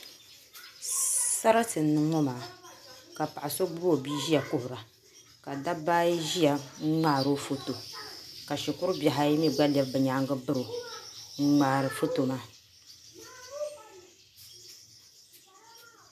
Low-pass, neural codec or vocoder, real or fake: 14.4 kHz; none; real